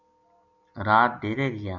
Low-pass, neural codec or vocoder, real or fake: 7.2 kHz; none; real